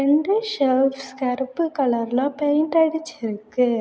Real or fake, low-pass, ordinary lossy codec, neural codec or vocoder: real; none; none; none